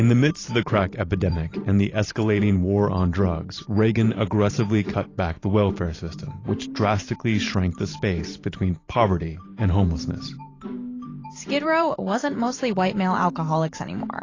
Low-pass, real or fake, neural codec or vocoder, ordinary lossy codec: 7.2 kHz; fake; vocoder, 44.1 kHz, 128 mel bands every 512 samples, BigVGAN v2; AAC, 32 kbps